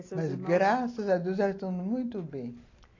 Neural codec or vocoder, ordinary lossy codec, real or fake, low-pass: none; AAC, 32 kbps; real; 7.2 kHz